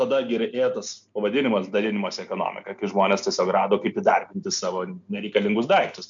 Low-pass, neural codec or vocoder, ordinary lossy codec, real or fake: 7.2 kHz; none; MP3, 64 kbps; real